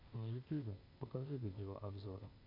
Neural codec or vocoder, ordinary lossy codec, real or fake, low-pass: codec, 16 kHz, 0.8 kbps, ZipCodec; MP3, 32 kbps; fake; 5.4 kHz